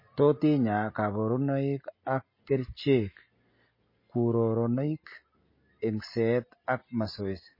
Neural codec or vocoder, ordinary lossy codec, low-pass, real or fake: none; MP3, 24 kbps; 5.4 kHz; real